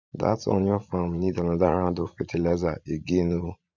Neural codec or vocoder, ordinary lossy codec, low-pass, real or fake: vocoder, 44.1 kHz, 80 mel bands, Vocos; none; 7.2 kHz; fake